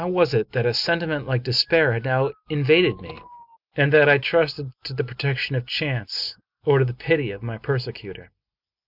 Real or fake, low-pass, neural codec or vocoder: real; 5.4 kHz; none